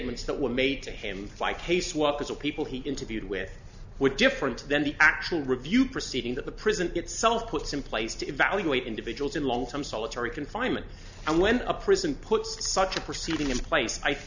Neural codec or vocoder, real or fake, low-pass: none; real; 7.2 kHz